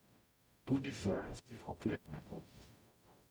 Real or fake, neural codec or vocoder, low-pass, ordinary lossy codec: fake; codec, 44.1 kHz, 0.9 kbps, DAC; none; none